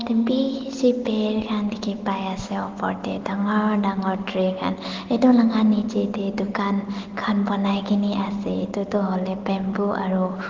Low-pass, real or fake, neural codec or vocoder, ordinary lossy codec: 7.2 kHz; real; none; Opus, 16 kbps